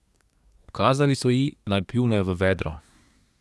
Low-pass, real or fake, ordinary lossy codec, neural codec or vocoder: none; fake; none; codec, 24 kHz, 1 kbps, SNAC